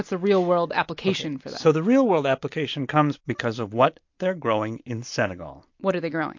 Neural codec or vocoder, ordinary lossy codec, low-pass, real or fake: none; MP3, 48 kbps; 7.2 kHz; real